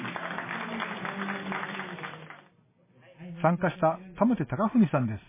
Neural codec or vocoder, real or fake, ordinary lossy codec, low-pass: none; real; MP3, 16 kbps; 3.6 kHz